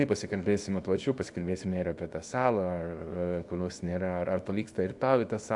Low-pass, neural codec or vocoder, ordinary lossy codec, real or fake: 10.8 kHz; codec, 24 kHz, 0.9 kbps, WavTokenizer, small release; AAC, 64 kbps; fake